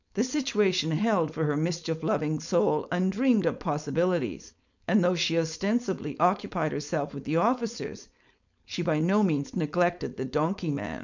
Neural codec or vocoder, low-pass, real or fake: codec, 16 kHz, 4.8 kbps, FACodec; 7.2 kHz; fake